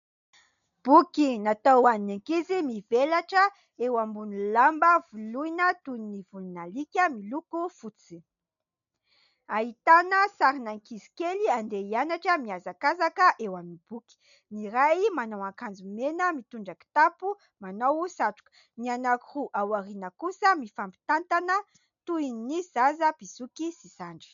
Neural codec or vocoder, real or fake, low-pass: none; real; 7.2 kHz